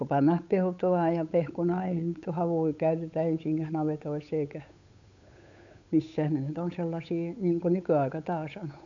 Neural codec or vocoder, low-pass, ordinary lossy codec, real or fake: codec, 16 kHz, 8 kbps, FunCodec, trained on Chinese and English, 25 frames a second; 7.2 kHz; none; fake